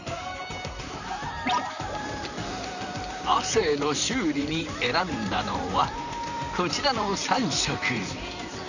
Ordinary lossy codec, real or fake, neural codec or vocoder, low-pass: none; fake; vocoder, 44.1 kHz, 128 mel bands, Pupu-Vocoder; 7.2 kHz